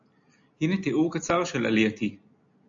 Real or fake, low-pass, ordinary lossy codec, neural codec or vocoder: real; 7.2 kHz; AAC, 64 kbps; none